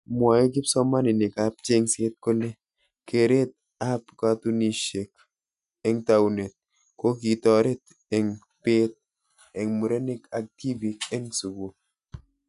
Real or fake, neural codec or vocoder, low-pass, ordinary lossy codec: real; none; 10.8 kHz; none